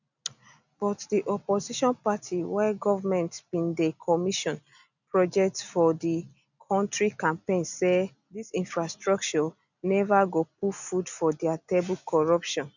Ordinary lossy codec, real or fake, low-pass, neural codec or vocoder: none; real; 7.2 kHz; none